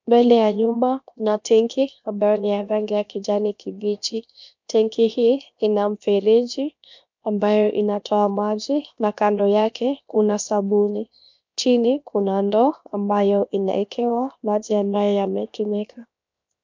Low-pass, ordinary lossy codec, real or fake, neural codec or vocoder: 7.2 kHz; MP3, 64 kbps; fake; codec, 16 kHz, 0.7 kbps, FocalCodec